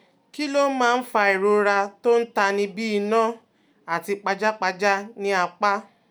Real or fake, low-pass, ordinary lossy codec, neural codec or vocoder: real; 19.8 kHz; none; none